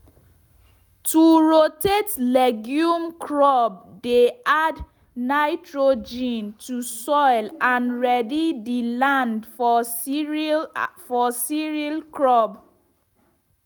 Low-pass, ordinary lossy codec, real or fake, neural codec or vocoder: none; none; real; none